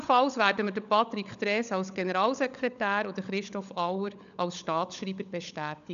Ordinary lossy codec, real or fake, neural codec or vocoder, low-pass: none; fake; codec, 16 kHz, 16 kbps, FunCodec, trained on LibriTTS, 50 frames a second; 7.2 kHz